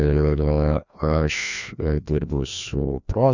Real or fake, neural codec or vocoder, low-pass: fake; codec, 16 kHz, 1 kbps, FreqCodec, larger model; 7.2 kHz